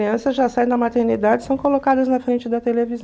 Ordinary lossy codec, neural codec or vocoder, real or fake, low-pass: none; none; real; none